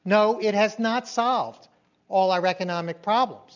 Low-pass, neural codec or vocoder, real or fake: 7.2 kHz; none; real